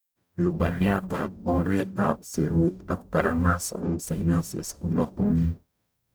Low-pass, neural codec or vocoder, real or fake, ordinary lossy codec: none; codec, 44.1 kHz, 0.9 kbps, DAC; fake; none